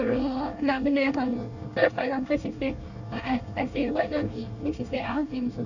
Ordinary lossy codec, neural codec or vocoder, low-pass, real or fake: none; codec, 24 kHz, 1 kbps, SNAC; 7.2 kHz; fake